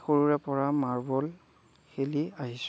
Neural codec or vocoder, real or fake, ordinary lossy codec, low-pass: none; real; none; none